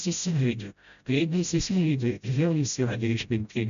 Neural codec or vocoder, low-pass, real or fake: codec, 16 kHz, 0.5 kbps, FreqCodec, smaller model; 7.2 kHz; fake